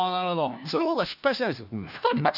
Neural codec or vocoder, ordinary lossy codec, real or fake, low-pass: codec, 16 kHz, 1 kbps, FunCodec, trained on LibriTTS, 50 frames a second; none; fake; 5.4 kHz